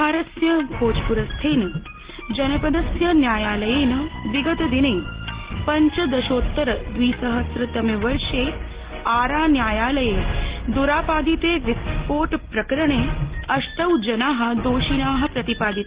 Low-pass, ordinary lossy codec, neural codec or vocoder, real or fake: 3.6 kHz; Opus, 16 kbps; none; real